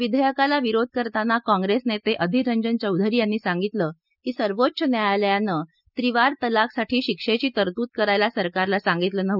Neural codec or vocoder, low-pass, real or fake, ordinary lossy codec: none; 5.4 kHz; real; none